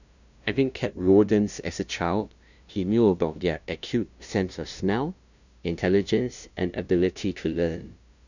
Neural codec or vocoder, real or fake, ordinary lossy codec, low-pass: codec, 16 kHz, 0.5 kbps, FunCodec, trained on LibriTTS, 25 frames a second; fake; none; 7.2 kHz